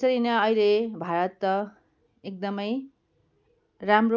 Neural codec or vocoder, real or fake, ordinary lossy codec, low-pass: none; real; none; 7.2 kHz